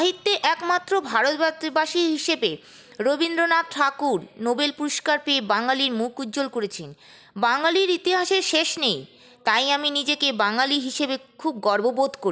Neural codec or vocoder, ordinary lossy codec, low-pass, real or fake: none; none; none; real